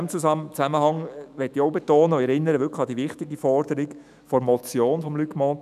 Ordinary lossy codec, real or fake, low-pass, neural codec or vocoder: none; fake; 14.4 kHz; autoencoder, 48 kHz, 128 numbers a frame, DAC-VAE, trained on Japanese speech